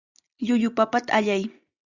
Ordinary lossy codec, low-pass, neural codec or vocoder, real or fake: Opus, 64 kbps; 7.2 kHz; none; real